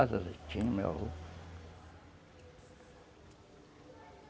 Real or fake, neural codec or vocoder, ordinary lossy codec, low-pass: real; none; none; none